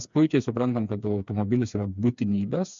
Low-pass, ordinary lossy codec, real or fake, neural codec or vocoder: 7.2 kHz; MP3, 64 kbps; fake; codec, 16 kHz, 4 kbps, FreqCodec, smaller model